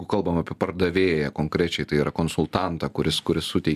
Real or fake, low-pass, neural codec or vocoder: real; 14.4 kHz; none